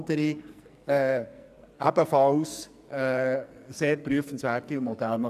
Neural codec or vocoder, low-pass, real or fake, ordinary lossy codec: codec, 44.1 kHz, 2.6 kbps, SNAC; 14.4 kHz; fake; none